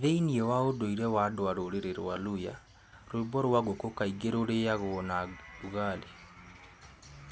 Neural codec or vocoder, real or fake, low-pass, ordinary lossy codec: none; real; none; none